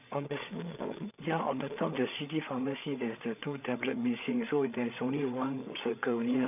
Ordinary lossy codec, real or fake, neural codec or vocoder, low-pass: none; fake; codec, 16 kHz, 8 kbps, FreqCodec, larger model; 3.6 kHz